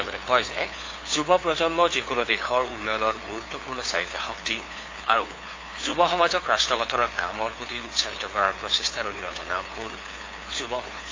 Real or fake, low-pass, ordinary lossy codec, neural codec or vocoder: fake; 7.2 kHz; AAC, 32 kbps; codec, 16 kHz, 2 kbps, FunCodec, trained on LibriTTS, 25 frames a second